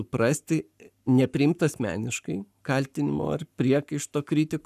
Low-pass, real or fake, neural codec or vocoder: 14.4 kHz; fake; codec, 44.1 kHz, 7.8 kbps, Pupu-Codec